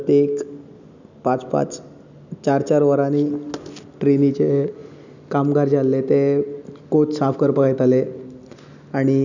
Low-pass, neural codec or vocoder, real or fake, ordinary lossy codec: 7.2 kHz; none; real; none